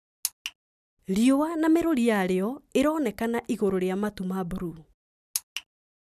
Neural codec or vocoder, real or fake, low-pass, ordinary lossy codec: none; real; 14.4 kHz; none